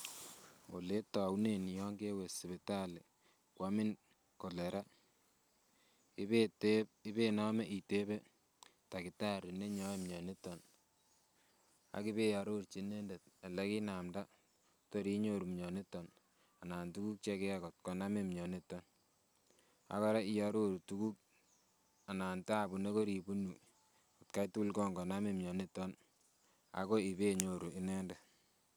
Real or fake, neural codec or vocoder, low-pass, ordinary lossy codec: real; none; none; none